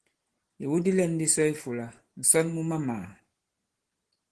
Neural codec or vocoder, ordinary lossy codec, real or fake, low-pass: none; Opus, 16 kbps; real; 10.8 kHz